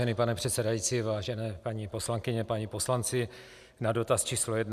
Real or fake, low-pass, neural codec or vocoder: real; 14.4 kHz; none